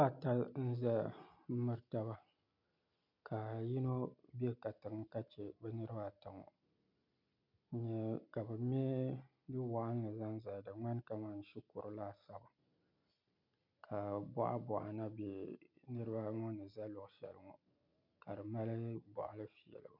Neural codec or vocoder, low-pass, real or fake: none; 5.4 kHz; real